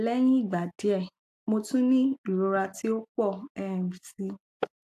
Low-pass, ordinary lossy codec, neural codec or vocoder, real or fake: 14.4 kHz; AAC, 64 kbps; none; real